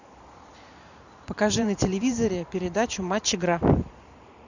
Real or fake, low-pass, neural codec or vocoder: fake; 7.2 kHz; vocoder, 44.1 kHz, 128 mel bands every 512 samples, BigVGAN v2